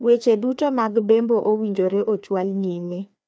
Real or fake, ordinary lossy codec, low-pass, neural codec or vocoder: fake; none; none; codec, 16 kHz, 2 kbps, FreqCodec, larger model